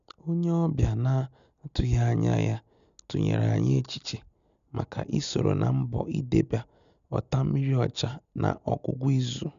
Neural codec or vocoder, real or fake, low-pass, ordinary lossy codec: none; real; 7.2 kHz; none